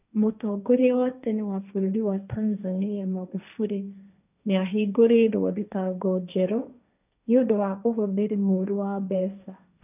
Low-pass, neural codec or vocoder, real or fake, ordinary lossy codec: 3.6 kHz; codec, 16 kHz, 1.1 kbps, Voila-Tokenizer; fake; none